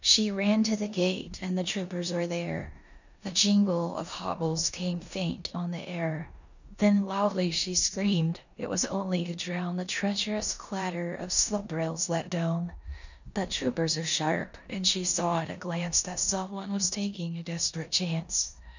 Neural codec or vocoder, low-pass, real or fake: codec, 16 kHz in and 24 kHz out, 0.9 kbps, LongCat-Audio-Codec, four codebook decoder; 7.2 kHz; fake